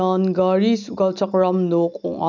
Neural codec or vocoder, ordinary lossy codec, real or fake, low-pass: none; none; real; 7.2 kHz